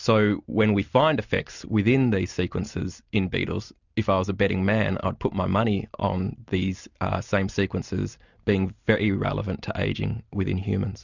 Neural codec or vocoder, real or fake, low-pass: none; real; 7.2 kHz